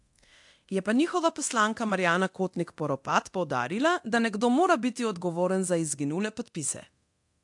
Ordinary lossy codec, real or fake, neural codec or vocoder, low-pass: AAC, 64 kbps; fake; codec, 24 kHz, 0.9 kbps, DualCodec; 10.8 kHz